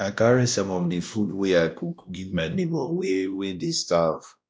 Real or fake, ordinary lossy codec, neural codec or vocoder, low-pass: fake; none; codec, 16 kHz, 1 kbps, X-Codec, WavLM features, trained on Multilingual LibriSpeech; none